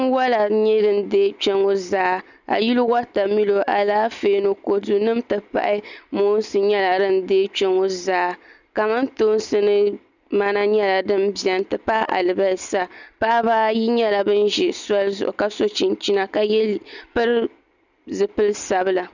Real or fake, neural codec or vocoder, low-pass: real; none; 7.2 kHz